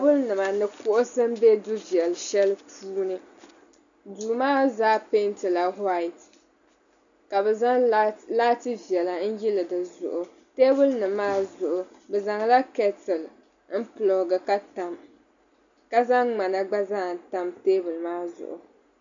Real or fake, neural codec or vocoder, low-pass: real; none; 7.2 kHz